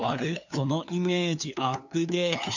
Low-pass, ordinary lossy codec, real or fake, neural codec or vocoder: 7.2 kHz; none; fake; codec, 16 kHz, 2 kbps, FunCodec, trained on LibriTTS, 25 frames a second